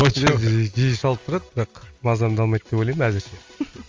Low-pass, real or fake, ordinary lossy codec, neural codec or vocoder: 7.2 kHz; real; Opus, 32 kbps; none